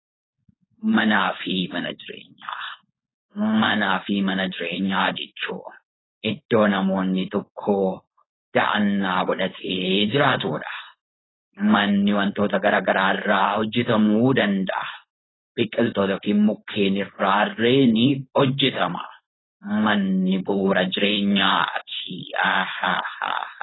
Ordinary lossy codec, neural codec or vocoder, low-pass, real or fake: AAC, 16 kbps; codec, 16 kHz, 4.8 kbps, FACodec; 7.2 kHz; fake